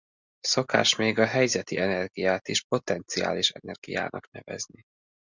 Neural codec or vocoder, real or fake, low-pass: none; real; 7.2 kHz